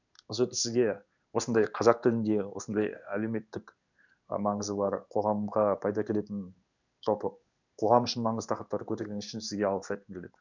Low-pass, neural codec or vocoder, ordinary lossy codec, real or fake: 7.2 kHz; codec, 16 kHz in and 24 kHz out, 1 kbps, XY-Tokenizer; none; fake